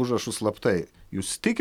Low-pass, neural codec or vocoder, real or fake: 19.8 kHz; none; real